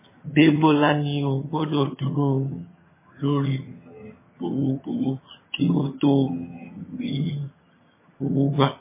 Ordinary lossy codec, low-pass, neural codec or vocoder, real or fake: MP3, 16 kbps; 3.6 kHz; vocoder, 22.05 kHz, 80 mel bands, HiFi-GAN; fake